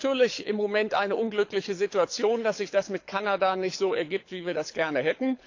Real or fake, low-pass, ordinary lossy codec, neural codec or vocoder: fake; 7.2 kHz; AAC, 48 kbps; codec, 24 kHz, 6 kbps, HILCodec